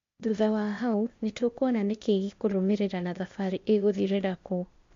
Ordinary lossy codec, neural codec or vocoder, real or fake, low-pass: MP3, 48 kbps; codec, 16 kHz, 0.8 kbps, ZipCodec; fake; 7.2 kHz